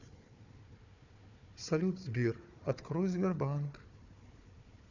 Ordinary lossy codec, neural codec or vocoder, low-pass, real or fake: none; codec, 16 kHz, 4 kbps, FunCodec, trained on Chinese and English, 50 frames a second; 7.2 kHz; fake